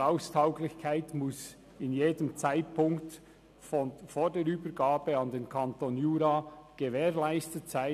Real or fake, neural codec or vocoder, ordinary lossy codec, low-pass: real; none; none; 14.4 kHz